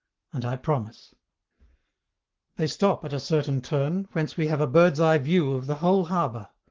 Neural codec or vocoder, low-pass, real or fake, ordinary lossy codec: codec, 44.1 kHz, 7.8 kbps, Pupu-Codec; 7.2 kHz; fake; Opus, 16 kbps